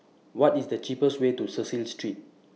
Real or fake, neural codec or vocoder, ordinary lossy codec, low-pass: real; none; none; none